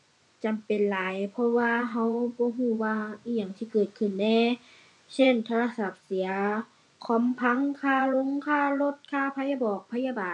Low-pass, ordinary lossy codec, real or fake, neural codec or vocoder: 10.8 kHz; none; fake; vocoder, 24 kHz, 100 mel bands, Vocos